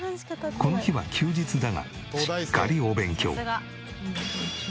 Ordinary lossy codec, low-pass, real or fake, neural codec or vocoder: none; none; real; none